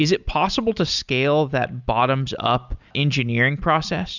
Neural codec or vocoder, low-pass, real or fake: none; 7.2 kHz; real